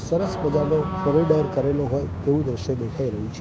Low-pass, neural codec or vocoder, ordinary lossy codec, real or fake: none; codec, 16 kHz, 6 kbps, DAC; none; fake